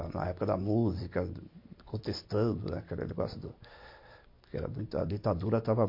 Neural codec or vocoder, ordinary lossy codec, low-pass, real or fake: none; MP3, 32 kbps; 5.4 kHz; real